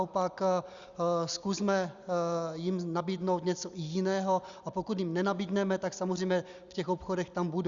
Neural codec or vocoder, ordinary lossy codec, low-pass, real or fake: none; Opus, 64 kbps; 7.2 kHz; real